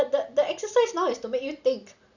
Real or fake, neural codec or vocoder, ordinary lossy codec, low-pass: real; none; none; 7.2 kHz